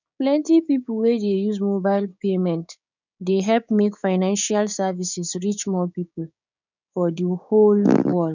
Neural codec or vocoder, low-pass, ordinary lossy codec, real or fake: codec, 16 kHz, 6 kbps, DAC; 7.2 kHz; none; fake